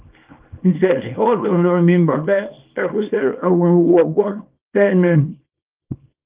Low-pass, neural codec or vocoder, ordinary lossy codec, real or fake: 3.6 kHz; codec, 24 kHz, 0.9 kbps, WavTokenizer, small release; Opus, 32 kbps; fake